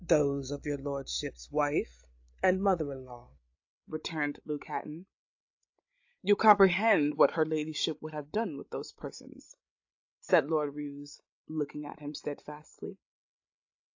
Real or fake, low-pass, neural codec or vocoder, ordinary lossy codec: fake; 7.2 kHz; codec, 16 kHz, 16 kbps, FreqCodec, larger model; AAC, 48 kbps